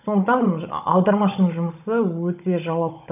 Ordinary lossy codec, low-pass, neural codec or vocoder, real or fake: none; 3.6 kHz; codec, 16 kHz, 16 kbps, FunCodec, trained on Chinese and English, 50 frames a second; fake